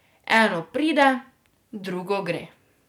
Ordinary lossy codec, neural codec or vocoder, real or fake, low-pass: none; vocoder, 44.1 kHz, 128 mel bands every 256 samples, BigVGAN v2; fake; 19.8 kHz